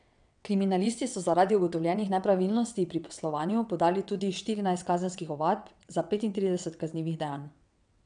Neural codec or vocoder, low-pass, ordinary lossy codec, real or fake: vocoder, 22.05 kHz, 80 mel bands, WaveNeXt; 9.9 kHz; none; fake